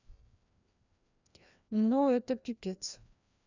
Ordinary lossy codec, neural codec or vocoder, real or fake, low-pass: none; codec, 16 kHz, 1 kbps, FreqCodec, larger model; fake; 7.2 kHz